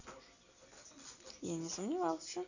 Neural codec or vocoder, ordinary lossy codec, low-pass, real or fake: none; none; 7.2 kHz; real